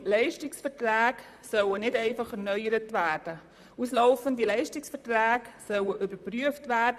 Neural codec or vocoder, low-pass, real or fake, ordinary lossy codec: vocoder, 44.1 kHz, 128 mel bands, Pupu-Vocoder; 14.4 kHz; fake; none